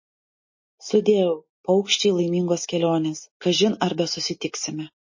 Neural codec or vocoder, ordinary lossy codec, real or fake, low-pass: none; MP3, 32 kbps; real; 7.2 kHz